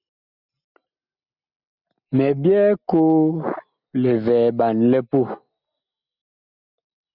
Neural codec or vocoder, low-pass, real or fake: none; 5.4 kHz; real